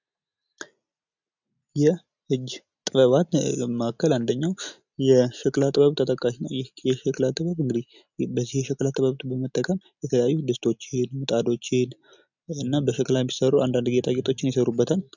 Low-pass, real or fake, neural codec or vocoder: 7.2 kHz; real; none